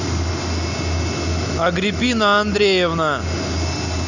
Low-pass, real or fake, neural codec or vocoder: 7.2 kHz; real; none